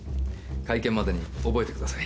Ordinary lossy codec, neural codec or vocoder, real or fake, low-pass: none; none; real; none